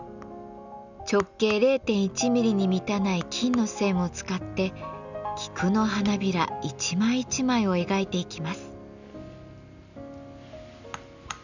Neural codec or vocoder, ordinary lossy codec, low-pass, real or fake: none; none; 7.2 kHz; real